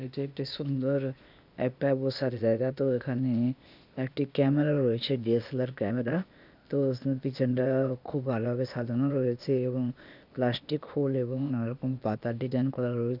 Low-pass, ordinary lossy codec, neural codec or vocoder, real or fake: 5.4 kHz; none; codec, 16 kHz, 0.8 kbps, ZipCodec; fake